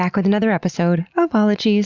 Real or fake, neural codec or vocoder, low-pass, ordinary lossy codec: real; none; 7.2 kHz; Opus, 64 kbps